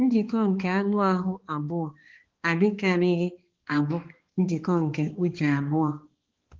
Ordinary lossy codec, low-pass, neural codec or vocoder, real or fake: Opus, 16 kbps; 7.2 kHz; codec, 16 kHz, 2 kbps, X-Codec, HuBERT features, trained on balanced general audio; fake